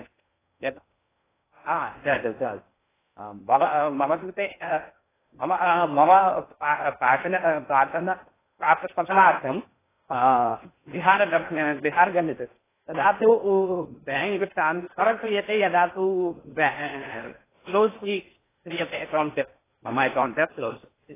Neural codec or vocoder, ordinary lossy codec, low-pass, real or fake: codec, 16 kHz in and 24 kHz out, 0.6 kbps, FocalCodec, streaming, 4096 codes; AAC, 16 kbps; 3.6 kHz; fake